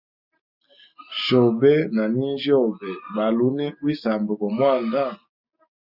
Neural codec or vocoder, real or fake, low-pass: none; real; 5.4 kHz